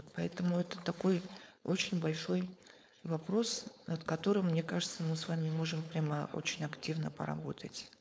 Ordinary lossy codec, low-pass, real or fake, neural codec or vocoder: none; none; fake; codec, 16 kHz, 4.8 kbps, FACodec